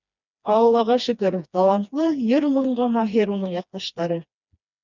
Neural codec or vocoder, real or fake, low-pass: codec, 16 kHz, 2 kbps, FreqCodec, smaller model; fake; 7.2 kHz